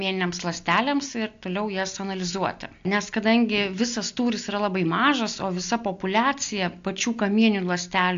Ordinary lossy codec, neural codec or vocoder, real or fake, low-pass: AAC, 64 kbps; none; real; 7.2 kHz